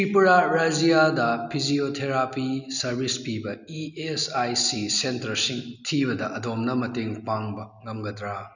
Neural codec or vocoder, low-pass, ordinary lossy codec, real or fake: none; 7.2 kHz; none; real